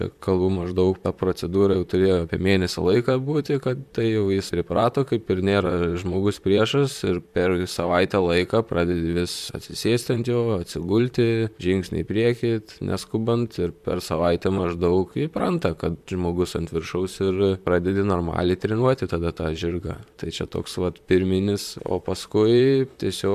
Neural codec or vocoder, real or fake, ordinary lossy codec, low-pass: vocoder, 44.1 kHz, 128 mel bands, Pupu-Vocoder; fake; MP3, 96 kbps; 14.4 kHz